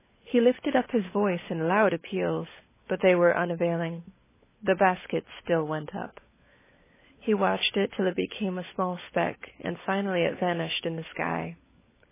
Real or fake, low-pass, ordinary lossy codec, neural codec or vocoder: fake; 3.6 kHz; MP3, 16 kbps; codec, 16 kHz, 16 kbps, FunCodec, trained on LibriTTS, 50 frames a second